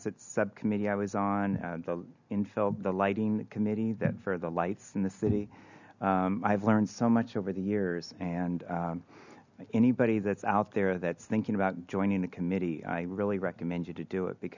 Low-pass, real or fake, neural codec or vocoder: 7.2 kHz; real; none